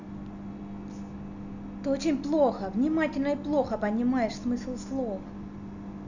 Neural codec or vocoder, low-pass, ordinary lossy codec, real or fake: none; 7.2 kHz; none; real